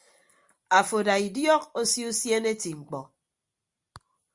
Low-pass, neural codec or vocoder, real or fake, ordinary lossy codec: 10.8 kHz; none; real; Opus, 64 kbps